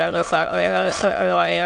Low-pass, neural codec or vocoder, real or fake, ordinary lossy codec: 9.9 kHz; autoencoder, 22.05 kHz, a latent of 192 numbers a frame, VITS, trained on many speakers; fake; AAC, 64 kbps